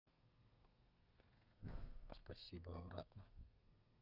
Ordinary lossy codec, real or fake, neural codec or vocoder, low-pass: none; fake; codec, 32 kHz, 1.9 kbps, SNAC; 5.4 kHz